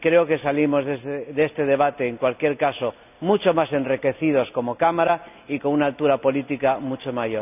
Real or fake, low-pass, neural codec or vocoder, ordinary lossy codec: real; 3.6 kHz; none; none